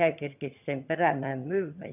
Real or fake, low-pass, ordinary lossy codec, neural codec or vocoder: fake; 3.6 kHz; none; vocoder, 22.05 kHz, 80 mel bands, HiFi-GAN